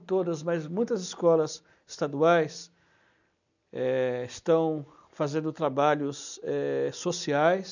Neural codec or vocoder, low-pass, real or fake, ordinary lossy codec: none; 7.2 kHz; real; none